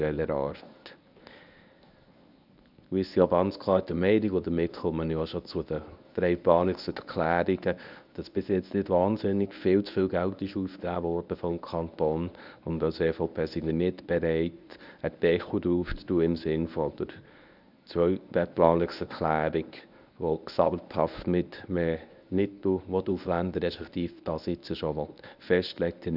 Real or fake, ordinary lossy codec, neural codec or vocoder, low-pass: fake; none; codec, 24 kHz, 0.9 kbps, WavTokenizer, medium speech release version 1; 5.4 kHz